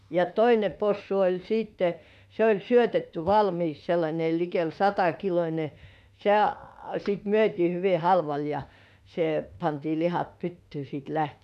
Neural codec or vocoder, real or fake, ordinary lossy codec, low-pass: autoencoder, 48 kHz, 32 numbers a frame, DAC-VAE, trained on Japanese speech; fake; none; 14.4 kHz